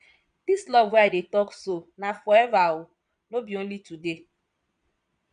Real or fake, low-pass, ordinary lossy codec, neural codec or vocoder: fake; 9.9 kHz; none; vocoder, 22.05 kHz, 80 mel bands, Vocos